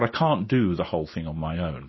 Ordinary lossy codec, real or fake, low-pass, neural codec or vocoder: MP3, 24 kbps; real; 7.2 kHz; none